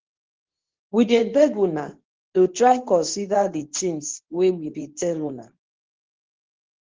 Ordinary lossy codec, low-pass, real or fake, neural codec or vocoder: Opus, 16 kbps; 7.2 kHz; fake; codec, 24 kHz, 0.9 kbps, WavTokenizer, medium speech release version 2